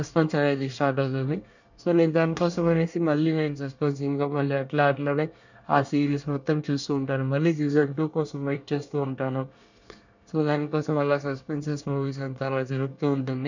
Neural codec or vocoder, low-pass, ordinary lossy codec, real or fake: codec, 24 kHz, 1 kbps, SNAC; 7.2 kHz; none; fake